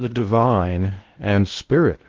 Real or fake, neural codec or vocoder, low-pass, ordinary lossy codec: fake; codec, 16 kHz in and 24 kHz out, 0.6 kbps, FocalCodec, streaming, 2048 codes; 7.2 kHz; Opus, 16 kbps